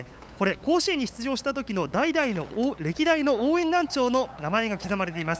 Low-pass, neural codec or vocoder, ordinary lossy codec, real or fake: none; codec, 16 kHz, 8 kbps, FunCodec, trained on LibriTTS, 25 frames a second; none; fake